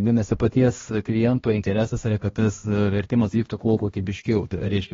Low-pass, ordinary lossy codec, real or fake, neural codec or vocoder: 7.2 kHz; AAC, 24 kbps; fake; codec, 16 kHz, 1 kbps, X-Codec, HuBERT features, trained on balanced general audio